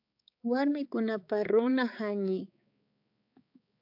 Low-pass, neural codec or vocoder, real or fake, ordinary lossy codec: 5.4 kHz; codec, 16 kHz, 4 kbps, X-Codec, HuBERT features, trained on balanced general audio; fake; AAC, 48 kbps